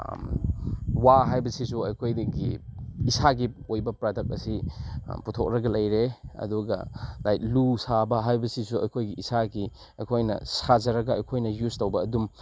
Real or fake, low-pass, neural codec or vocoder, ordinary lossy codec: real; none; none; none